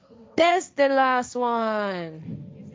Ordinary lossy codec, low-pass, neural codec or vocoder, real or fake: none; none; codec, 16 kHz, 1.1 kbps, Voila-Tokenizer; fake